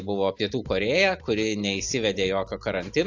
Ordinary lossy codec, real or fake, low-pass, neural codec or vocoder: AAC, 48 kbps; real; 7.2 kHz; none